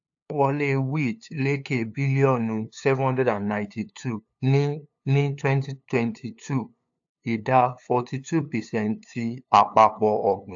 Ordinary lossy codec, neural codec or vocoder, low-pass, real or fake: AAC, 64 kbps; codec, 16 kHz, 2 kbps, FunCodec, trained on LibriTTS, 25 frames a second; 7.2 kHz; fake